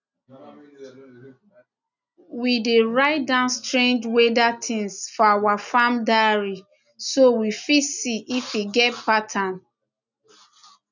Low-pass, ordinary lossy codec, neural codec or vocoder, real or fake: 7.2 kHz; none; none; real